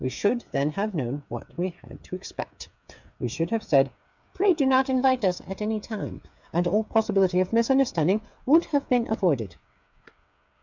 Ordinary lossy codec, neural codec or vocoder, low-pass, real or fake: MP3, 64 kbps; codec, 16 kHz, 8 kbps, FreqCodec, smaller model; 7.2 kHz; fake